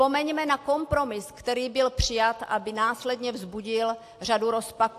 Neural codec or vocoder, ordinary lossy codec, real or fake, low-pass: none; AAC, 64 kbps; real; 14.4 kHz